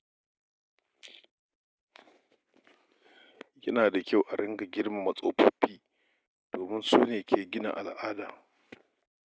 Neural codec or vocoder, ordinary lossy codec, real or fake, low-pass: none; none; real; none